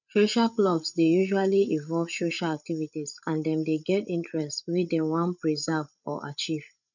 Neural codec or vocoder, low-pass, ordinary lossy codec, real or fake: codec, 16 kHz, 8 kbps, FreqCodec, larger model; 7.2 kHz; none; fake